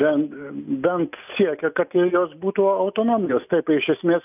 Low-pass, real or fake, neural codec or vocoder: 3.6 kHz; real; none